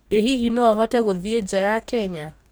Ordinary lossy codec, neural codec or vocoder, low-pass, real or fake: none; codec, 44.1 kHz, 2.6 kbps, DAC; none; fake